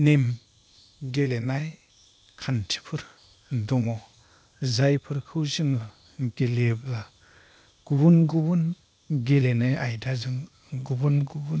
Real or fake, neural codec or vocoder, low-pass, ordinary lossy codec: fake; codec, 16 kHz, 0.8 kbps, ZipCodec; none; none